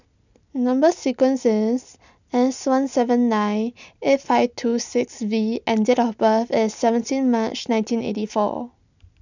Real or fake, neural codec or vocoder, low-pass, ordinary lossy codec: real; none; 7.2 kHz; none